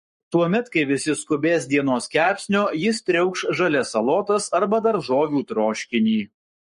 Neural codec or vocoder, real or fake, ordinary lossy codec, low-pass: codec, 44.1 kHz, 7.8 kbps, Pupu-Codec; fake; MP3, 48 kbps; 14.4 kHz